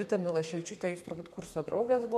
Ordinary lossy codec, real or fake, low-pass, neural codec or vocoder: MP3, 64 kbps; fake; 14.4 kHz; codec, 32 kHz, 1.9 kbps, SNAC